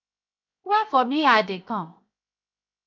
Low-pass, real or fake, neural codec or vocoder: 7.2 kHz; fake; codec, 16 kHz, 0.7 kbps, FocalCodec